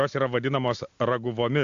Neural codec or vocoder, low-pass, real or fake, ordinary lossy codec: none; 7.2 kHz; real; AAC, 64 kbps